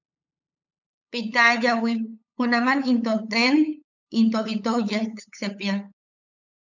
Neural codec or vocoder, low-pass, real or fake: codec, 16 kHz, 8 kbps, FunCodec, trained on LibriTTS, 25 frames a second; 7.2 kHz; fake